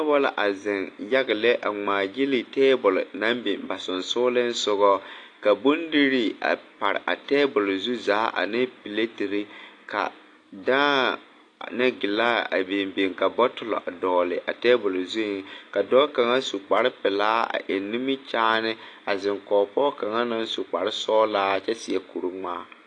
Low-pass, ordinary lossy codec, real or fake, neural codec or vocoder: 9.9 kHz; AAC, 48 kbps; real; none